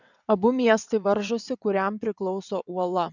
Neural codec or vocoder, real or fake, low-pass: vocoder, 22.05 kHz, 80 mel bands, WaveNeXt; fake; 7.2 kHz